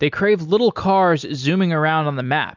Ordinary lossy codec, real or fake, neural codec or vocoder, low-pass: MP3, 64 kbps; real; none; 7.2 kHz